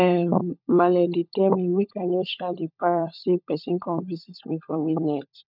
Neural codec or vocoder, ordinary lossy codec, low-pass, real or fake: codec, 16 kHz, 16 kbps, FunCodec, trained on LibriTTS, 50 frames a second; none; 5.4 kHz; fake